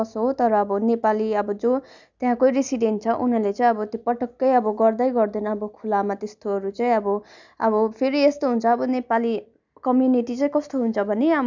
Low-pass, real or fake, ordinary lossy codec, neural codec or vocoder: 7.2 kHz; real; none; none